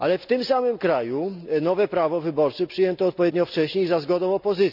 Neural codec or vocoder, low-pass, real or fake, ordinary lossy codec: none; 5.4 kHz; real; none